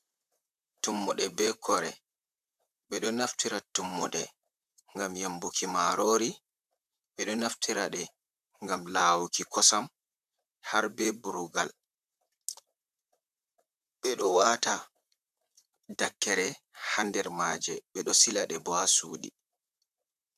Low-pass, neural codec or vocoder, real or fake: 14.4 kHz; vocoder, 44.1 kHz, 128 mel bands, Pupu-Vocoder; fake